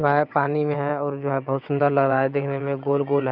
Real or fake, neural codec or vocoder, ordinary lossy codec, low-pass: fake; vocoder, 22.05 kHz, 80 mel bands, WaveNeXt; none; 5.4 kHz